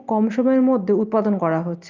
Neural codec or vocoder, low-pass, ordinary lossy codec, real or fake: none; 7.2 kHz; Opus, 24 kbps; real